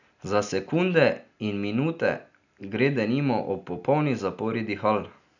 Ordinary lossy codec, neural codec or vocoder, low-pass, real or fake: none; none; 7.2 kHz; real